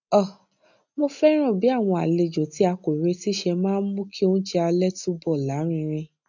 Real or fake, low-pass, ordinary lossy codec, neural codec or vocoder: real; 7.2 kHz; none; none